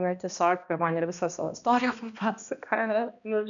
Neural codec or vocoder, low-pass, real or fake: codec, 16 kHz, 1 kbps, X-Codec, HuBERT features, trained on balanced general audio; 7.2 kHz; fake